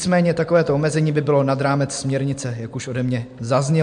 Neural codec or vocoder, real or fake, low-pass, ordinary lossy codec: none; real; 9.9 kHz; MP3, 64 kbps